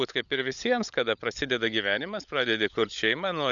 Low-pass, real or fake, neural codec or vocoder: 7.2 kHz; fake; codec, 16 kHz, 16 kbps, FunCodec, trained on LibriTTS, 50 frames a second